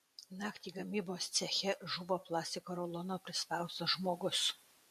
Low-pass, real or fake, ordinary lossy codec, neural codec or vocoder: 14.4 kHz; fake; MP3, 64 kbps; vocoder, 44.1 kHz, 128 mel bands every 512 samples, BigVGAN v2